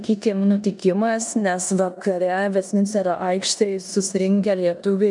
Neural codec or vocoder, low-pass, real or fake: codec, 16 kHz in and 24 kHz out, 0.9 kbps, LongCat-Audio-Codec, four codebook decoder; 10.8 kHz; fake